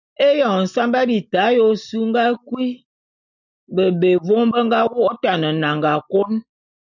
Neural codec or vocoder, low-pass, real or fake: none; 7.2 kHz; real